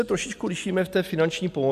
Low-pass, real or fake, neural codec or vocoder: 14.4 kHz; real; none